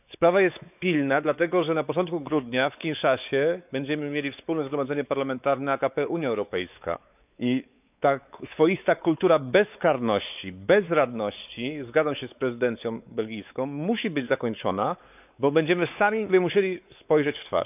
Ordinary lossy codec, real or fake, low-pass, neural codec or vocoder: none; fake; 3.6 kHz; codec, 16 kHz, 4 kbps, X-Codec, WavLM features, trained on Multilingual LibriSpeech